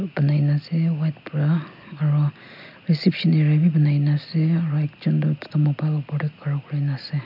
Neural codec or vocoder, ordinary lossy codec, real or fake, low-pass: none; MP3, 48 kbps; real; 5.4 kHz